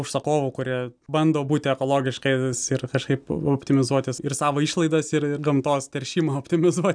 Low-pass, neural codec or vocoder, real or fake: 9.9 kHz; none; real